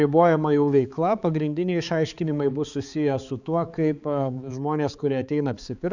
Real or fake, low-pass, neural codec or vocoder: fake; 7.2 kHz; codec, 16 kHz, 4 kbps, X-Codec, HuBERT features, trained on balanced general audio